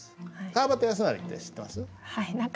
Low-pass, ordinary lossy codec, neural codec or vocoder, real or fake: none; none; none; real